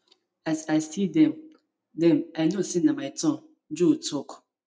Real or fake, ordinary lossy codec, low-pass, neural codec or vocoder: real; none; none; none